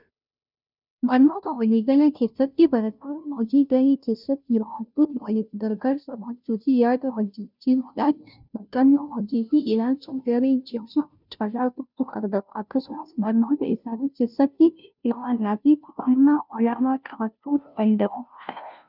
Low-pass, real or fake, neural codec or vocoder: 5.4 kHz; fake; codec, 16 kHz, 0.5 kbps, FunCodec, trained on Chinese and English, 25 frames a second